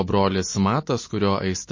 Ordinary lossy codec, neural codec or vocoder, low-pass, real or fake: MP3, 32 kbps; none; 7.2 kHz; real